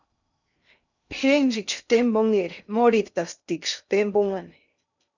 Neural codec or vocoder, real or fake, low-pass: codec, 16 kHz in and 24 kHz out, 0.6 kbps, FocalCodec, streaming, 2048 codes; fake; 7.2 kHz